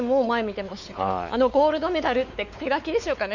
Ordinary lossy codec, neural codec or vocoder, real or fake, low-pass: none; codec, 16 kHz, 4 kbps, X-Codec, WavLM features, trained on Multilingual LibriSpeech; fake; 7.2 kHz